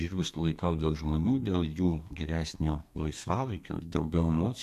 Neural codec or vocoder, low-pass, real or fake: codec, 32 kHz, 1.9 kbps, SNAC; 14.4 kHz; fake